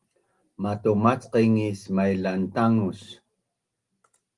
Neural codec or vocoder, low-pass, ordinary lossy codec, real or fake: none; 10.8 kHz; Opus, 24 kbps; real